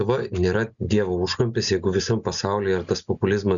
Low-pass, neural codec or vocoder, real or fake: 7.2 kHz; none; real